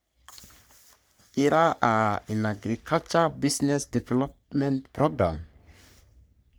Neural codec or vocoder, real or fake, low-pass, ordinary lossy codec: codec, 44.1 kHz, 3.4 kbps, Pupu-Codec; fake; none; none